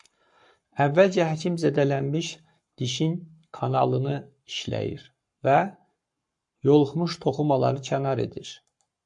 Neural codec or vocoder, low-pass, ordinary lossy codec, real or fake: codec, 44.1 kHz, 7.8 kbps, Pupu-Codec; 10.8 kHz; MP3, 64 kbps; fake